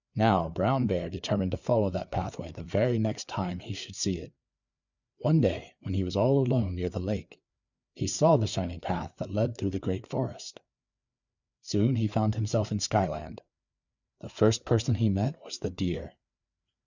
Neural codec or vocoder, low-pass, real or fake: codec, 16 kHz, 4 kbps, FreqCodec, larger model; 7.2 kHz; fake